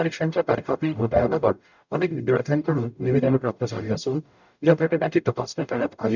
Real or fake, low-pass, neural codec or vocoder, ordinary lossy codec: fake; 7.2 kHz; codec, 44.1 kHz, 0.9 kbps, DAC; none